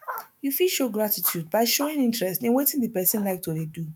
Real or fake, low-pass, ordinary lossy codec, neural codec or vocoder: fake; none; none; autoencoder, 48 kHz, 128 numbers a frame, DAC-VAE, trained on Japanese speech